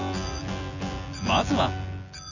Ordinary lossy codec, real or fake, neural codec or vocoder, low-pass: none; fake; vocoder, 24 kHz, 100 mel bands, Vocos; 7.2 kHz